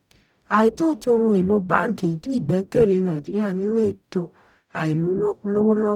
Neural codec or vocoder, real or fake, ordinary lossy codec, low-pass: codec, 44.1 kHz, 0.9 kbps, DAC; fake; none; 19.8 kHz